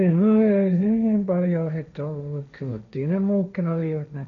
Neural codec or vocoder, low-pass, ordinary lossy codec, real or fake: codec, 16 kHz, 1.1 kbps, Voila-Tokenizer; 7.2 kHz; none; fake